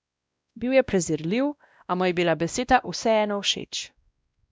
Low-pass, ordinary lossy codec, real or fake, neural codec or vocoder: none; none; fake; codec, 16 kHz, 1 kbps, X-Codec, WavLM features, trained on Multilingual LibriSpeech